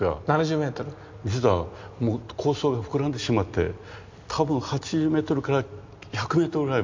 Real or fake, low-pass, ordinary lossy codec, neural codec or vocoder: real; 7.2 kHz; MP3, 48 kbps; none